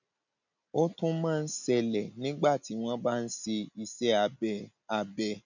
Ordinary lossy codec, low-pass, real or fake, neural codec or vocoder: none; 7.2 kHz; real; none